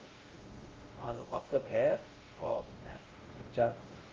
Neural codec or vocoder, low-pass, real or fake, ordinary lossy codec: codec, 16 kHz, 0.5 kbps, X-Codec, WavLM features, trained on Multilingual LibriSpeech; 7.2 kHz; fake; Opus, 32 kbps